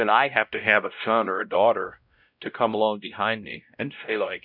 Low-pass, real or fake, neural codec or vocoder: 5.4 kHz; fake; codec, 16 kHz, 0.5 kbps, X-Codec, WavLM features, trained on Multilingual LibriSpeech